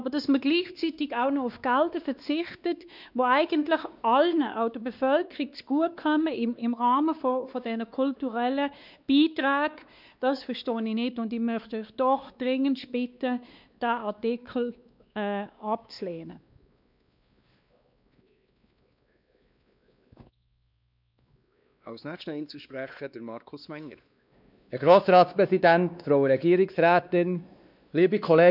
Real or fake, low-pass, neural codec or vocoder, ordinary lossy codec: fake; 5.4 kHz; codec, 16 kHz, 2 kbps, X-Codec, WavLM features, trained on Multilingual LibriSpeech; none